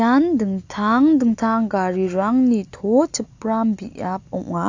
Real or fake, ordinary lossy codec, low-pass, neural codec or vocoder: real; AAC, 48 kbps; 7.2 kHz; none